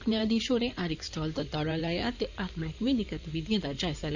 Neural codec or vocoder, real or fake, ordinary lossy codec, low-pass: codec, 16 kHz in and 24 kHz out, 2.2 kbps, FireRedTTS-2 codec; fake; none; 7.2 kHz